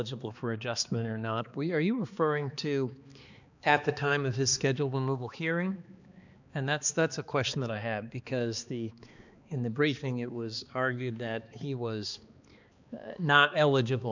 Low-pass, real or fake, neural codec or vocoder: 7.2 kHz; fake; codec, 16 kHz, 2 kbps, X-Codec, HuBERT features, trained on balanced general audio